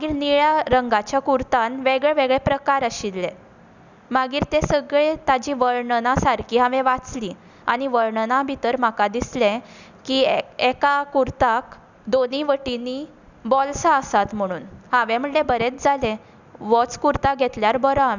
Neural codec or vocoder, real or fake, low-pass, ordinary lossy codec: none; real; 7.2 kHz; none